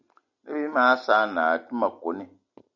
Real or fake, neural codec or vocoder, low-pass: real; none; 7.2 kHz